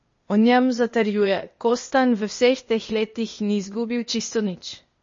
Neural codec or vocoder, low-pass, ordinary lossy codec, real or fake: codec, 16 kHz, 0.8 kbps, ZipCodec; 7.2 kHz; MP3, 32 kbps; fake